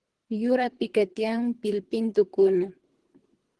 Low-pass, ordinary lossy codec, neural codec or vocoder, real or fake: 10.8 kHz; Opus, 16 kbps; codec, 24 kHz, 3 kbps, HILCodec; fake